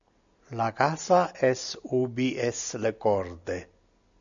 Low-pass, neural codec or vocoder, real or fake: 7.2 kHz; none; real